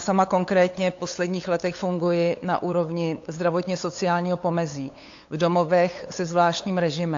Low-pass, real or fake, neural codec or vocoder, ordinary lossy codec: 7.2 kHz; fake; codec, 16 kHz, 8 kbps, FunCodec, trained on LibriTTS, 25 frames a second; AAC, 48 kbps